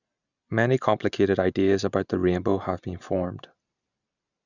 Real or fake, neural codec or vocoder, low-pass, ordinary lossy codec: real; none; 7.2 kHz; none